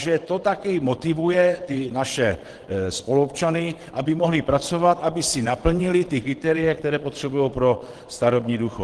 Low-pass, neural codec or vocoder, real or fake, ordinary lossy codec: 9.9 kHz; vocoder, 22.05 kHz, 80 mel bands, WaveNeXt; fake; Opus, 16 kbps